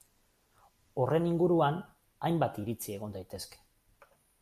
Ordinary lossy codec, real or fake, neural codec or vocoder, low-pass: AAC, 96 kbps; real; none; 14.4 kHz